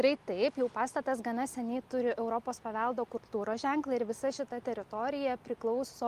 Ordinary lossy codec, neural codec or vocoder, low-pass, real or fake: Opus, 32 kbps; none; 14.4 kHz; real